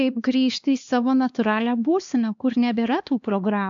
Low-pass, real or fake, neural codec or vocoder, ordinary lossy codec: 7.2 kHz; fake; codec, 16 kHz, 2 kbps, X-Codec, HuBERT features, trained on LibriSpeech; AAC, 64 kbps